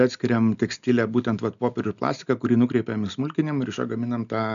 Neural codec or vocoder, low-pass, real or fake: none; 7.2 kHz; real